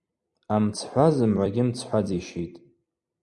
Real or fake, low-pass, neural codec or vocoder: fake; 10.8 kHz; vocoder, 44.1 kHz, 128 mel bands every 256 samples, BigVGAN v2